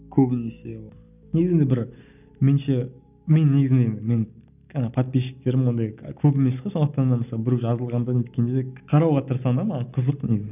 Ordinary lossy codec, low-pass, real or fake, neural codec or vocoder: none; 3.6 kHz; real; none